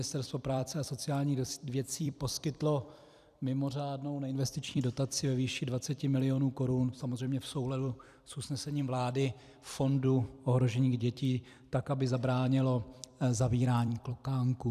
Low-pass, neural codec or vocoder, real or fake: 14.4 kHz; vocoder, 44.1 kHz, 128 mel bands every 256 samples, BigVGAN v2; fake